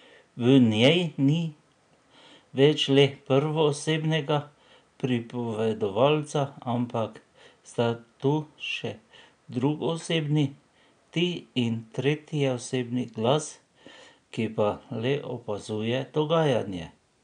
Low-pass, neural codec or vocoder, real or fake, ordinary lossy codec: 9.9 kHz; none; real; none